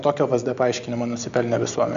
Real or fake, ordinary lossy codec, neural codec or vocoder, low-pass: real; AAC, 96 kbps; none; 7.2 kHz